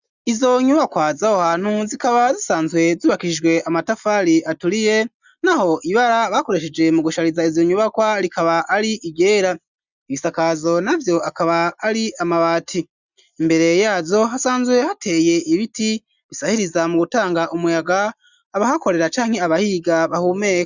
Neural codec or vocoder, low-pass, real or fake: none; 7.2 kHz; real